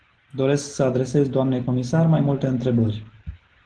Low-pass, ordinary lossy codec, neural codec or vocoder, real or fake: 9.9 kHz; Opus, 16 kbps; none; real